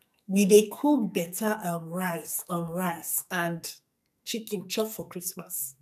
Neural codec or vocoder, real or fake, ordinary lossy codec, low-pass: codec, 44.1 kHz, 2.6 kbps, SNAC; fake; none; 14.4 kHz